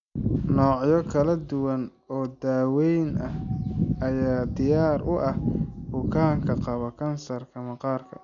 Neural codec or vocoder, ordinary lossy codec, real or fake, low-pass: none; none; real; 7.2 kHz